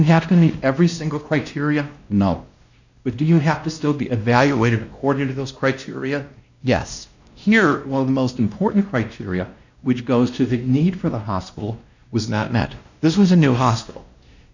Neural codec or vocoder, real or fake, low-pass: codec, 16 kHz, 1 kbps, X-Codec, WavLM features, trained on Multilingual LibriSpeech; fake; 7.2 kHz